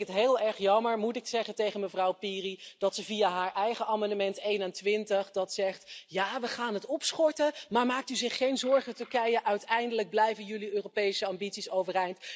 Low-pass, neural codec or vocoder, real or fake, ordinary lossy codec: none; none; real; none